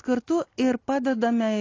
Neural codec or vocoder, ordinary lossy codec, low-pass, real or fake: none; AAC, 32 kbps; 7.2 kHz; real